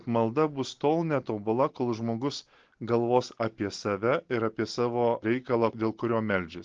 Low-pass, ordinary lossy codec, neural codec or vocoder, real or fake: 7.2 kHz; Opus, 16 kbps; none; real